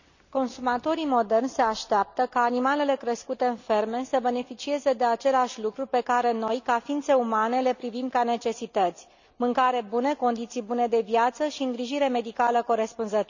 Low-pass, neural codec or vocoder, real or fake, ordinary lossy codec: 7.2 kHz; none; real; none